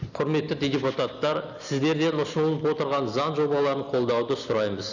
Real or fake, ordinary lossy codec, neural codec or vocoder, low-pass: real; none; none; 7.2 kHz